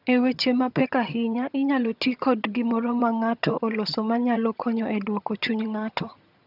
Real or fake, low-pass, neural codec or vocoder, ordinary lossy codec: fake; 5.4 kHz; vocoder, 22.05 kHz, 80 mel bands, HiFi-GAN; none